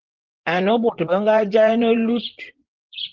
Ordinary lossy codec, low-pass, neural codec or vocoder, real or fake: Opus, 16 kbps; 7.2 kHz; vocoder, 24 kHz, 100 mel bands, Vocos; fake